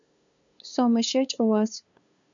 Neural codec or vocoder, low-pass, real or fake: codec, 16 kHz, 2 kbps, FunCodec, trained on LibriTTS, 25 frames a second; 7.2 kHz; fake